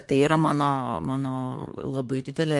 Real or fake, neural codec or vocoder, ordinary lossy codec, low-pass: fake; codec, 24 kHz, 1 kbps, SNAC; MP3, 64 kbps; 10.8 kHz